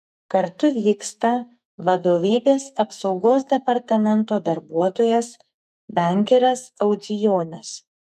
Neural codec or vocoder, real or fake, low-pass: codec, 44.1 kHz, 2.6 kbps, SNAC; fake; 14.4 kHz